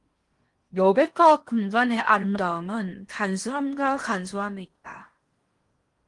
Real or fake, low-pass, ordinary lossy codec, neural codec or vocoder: fake; 10.8 kHz; Opus, 24 kbps; codec, 16 kHz in and 24 kHz out, 0.8 kbps, FocalCodec, streaming, 65536 codes